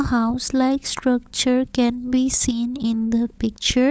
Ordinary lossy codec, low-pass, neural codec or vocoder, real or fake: none; none; codec, 16 kHz, 16 kbps, FunCodec, trained on LibriTTS, 50 frames a second; fake